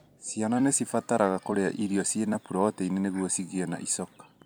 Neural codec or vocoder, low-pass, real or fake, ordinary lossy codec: vocoder, 44.1 kHz, 128 mel bands every 256 samples, BigVGAN v2; none; fake; none